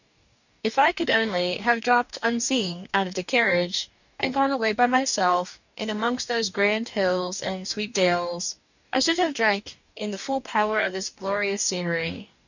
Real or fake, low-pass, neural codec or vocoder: fake; 7.2 kHz; codec, 44.1 kHz, 2.6 kbps, DAC